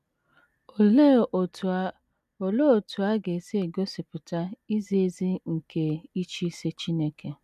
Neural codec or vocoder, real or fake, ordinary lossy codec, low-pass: none; real; none; 14.4 kHz